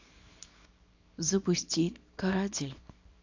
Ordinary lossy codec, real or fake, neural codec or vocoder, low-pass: AAC, 48 kbps; fake; codec, 24 kHz, 0.9 kbps, WavTokenizer, small release; 7.2 kHz